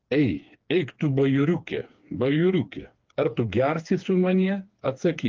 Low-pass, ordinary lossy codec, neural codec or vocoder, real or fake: 7.2 kHz; Opus, 32 kbps; codec, 16 kHz, 4 kbps, FreqCodec, smaller model; fake